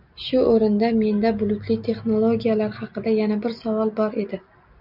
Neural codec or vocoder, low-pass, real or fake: none; 5.4 kHz; real